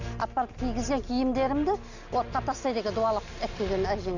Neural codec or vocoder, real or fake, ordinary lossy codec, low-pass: none; real; none; 7.2 kHz